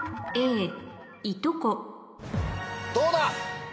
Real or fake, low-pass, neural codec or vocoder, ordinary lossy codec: real; none; none; none